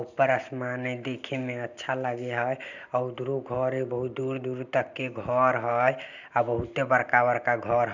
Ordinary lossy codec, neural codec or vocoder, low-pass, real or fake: none; none; 7.2 kHz; real